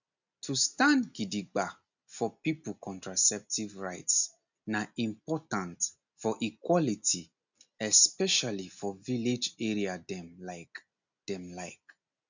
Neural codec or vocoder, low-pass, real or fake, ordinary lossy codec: none; 7.2 kHz; real; none